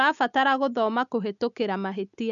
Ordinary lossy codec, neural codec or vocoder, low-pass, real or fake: none; none; 7.2 kHz; real